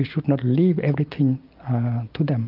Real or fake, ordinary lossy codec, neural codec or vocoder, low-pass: real; Opus, 32 kbps; none; 5.4 kHz